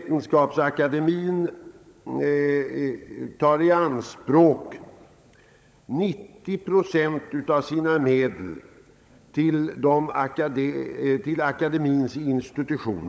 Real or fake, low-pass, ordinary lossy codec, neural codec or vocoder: fake; none; none; codec, 16 kHz, 16 kbps, FunCodec, trained on LibriTTS, 50 frames a second